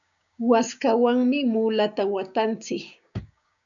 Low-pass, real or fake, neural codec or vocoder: 7.2 kHz; fake; codec, 16 kHz, 6 kbps, DAC